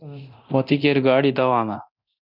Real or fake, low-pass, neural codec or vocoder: fake; 5.4 kHz; codec, 24 kHz, 0.9 kbps, DualCodec